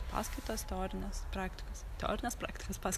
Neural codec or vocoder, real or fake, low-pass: none; real; 14.4 kHz